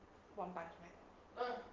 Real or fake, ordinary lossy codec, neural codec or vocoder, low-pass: real; Opus, 16 kbps; none; 7.2 kHz